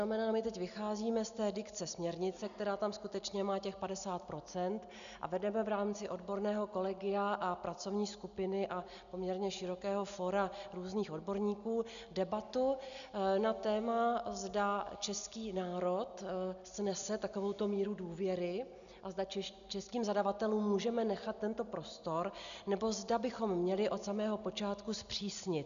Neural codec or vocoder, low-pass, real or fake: none; 7.2 kHz; real